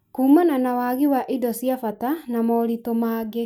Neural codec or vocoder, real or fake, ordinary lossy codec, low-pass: none; real; none; 19.8 kHz